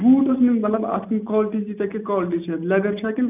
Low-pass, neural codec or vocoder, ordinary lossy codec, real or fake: 3.6 kHz; none; none; real